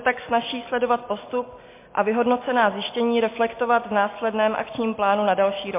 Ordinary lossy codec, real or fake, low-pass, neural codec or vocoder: MP3, 24 kbps; real; 3.6 kHz; none